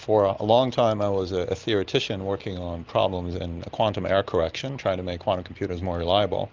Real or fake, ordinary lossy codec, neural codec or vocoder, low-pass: real; Opus, 32 kbps; none; 7.2 kHz